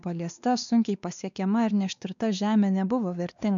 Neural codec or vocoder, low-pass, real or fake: codec, 16 kHz, 2 kbps, X-Codec, WavLM features, trained on Multilingual LibriSpeech; 7.2 kHz; fake